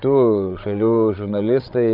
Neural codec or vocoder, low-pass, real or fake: codec, 16 kHz, 8 kbps, FreqCodec, larger model; 5.4 kHz; fake